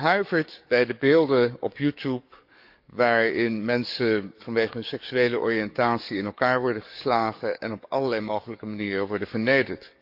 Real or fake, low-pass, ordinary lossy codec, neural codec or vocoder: fake; 5.4 kHz; none; codec, 44.1 kHz, 7.8 kbps, DAC